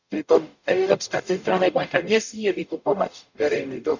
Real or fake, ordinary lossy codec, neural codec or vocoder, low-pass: fake; none; codec, 44.1 kHz, 0.9 kbps, DAC; 7.2 kHz